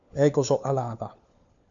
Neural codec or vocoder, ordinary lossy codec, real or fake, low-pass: codec, 16 kHz, 4 kbps, FunCodec, trained on LibriTTS, 50 frames a second; MP3, 96 kbps; fake; 7.2 kHz